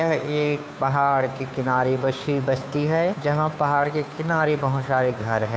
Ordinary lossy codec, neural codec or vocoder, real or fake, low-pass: none; codec, 16 kHz, 2 kbps, FunCodec, trained on Chinese and English, 25 frames a second; fake; none